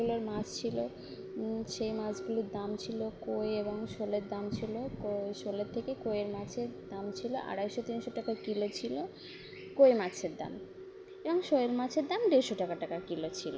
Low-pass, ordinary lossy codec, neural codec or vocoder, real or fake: none; none; none; real